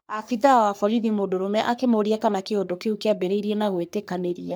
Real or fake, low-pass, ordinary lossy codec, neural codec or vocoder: fake; none; none; codec, 44.1 kHz, 3.4 kbps, Pupu-Codec